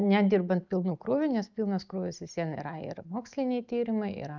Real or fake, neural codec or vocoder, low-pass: real; none; 7.2 kHz